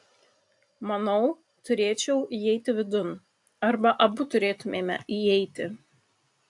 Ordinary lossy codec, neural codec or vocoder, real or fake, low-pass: AAC, 64 kbps; none; real; 10.8 kHz